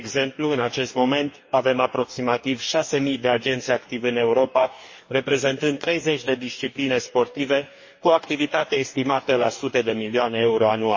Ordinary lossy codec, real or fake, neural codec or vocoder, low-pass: MP3, 32 kbps; fake; codec, 44.1 kHz, 2.6 kbps, DAC; 7.2 kHz